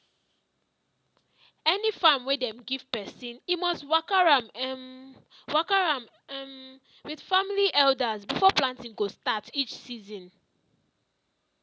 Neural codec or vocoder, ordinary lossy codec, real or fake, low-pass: none; none; real; none